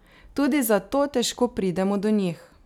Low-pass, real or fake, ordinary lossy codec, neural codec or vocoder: 19.8 kHz; real; none; none